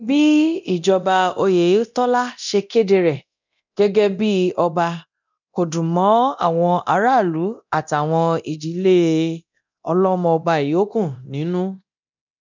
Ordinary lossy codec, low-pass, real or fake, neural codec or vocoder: none; 7.2 kHz; fake; codec, 24 kHz, 0.9 kbps, DualCodec